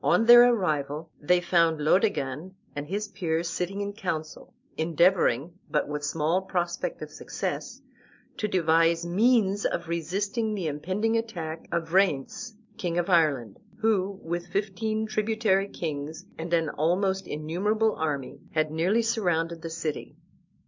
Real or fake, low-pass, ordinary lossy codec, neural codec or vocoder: real; 7.2 kHz; MP3, 64 kbps; none